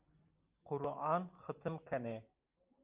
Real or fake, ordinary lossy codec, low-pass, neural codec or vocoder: real; Opus, 24 kbps; 3.6 kHz; none